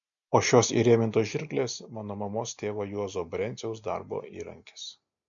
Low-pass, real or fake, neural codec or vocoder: 7.2 kHz; real; none